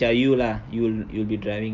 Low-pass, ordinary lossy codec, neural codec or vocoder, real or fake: 7.2 kHz; Opus, 24 kbps; none; real